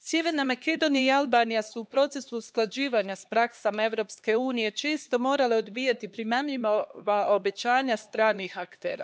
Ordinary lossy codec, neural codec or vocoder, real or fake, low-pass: none; codec, 16 kHz, 2 kbps, X-Codec, HuBERT features, trained on LibriSpeech; fake; none